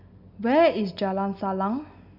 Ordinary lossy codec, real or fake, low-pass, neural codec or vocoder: none; real; 5.4 kHz; none